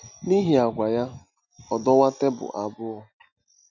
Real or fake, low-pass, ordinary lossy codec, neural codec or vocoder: real; 7.2 kHz; none; none